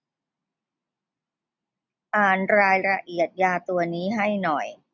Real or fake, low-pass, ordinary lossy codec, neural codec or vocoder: real; 7.2 kHz; none; none